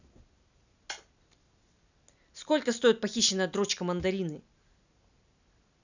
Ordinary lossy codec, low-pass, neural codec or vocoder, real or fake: none; 7.2 kHz; none; real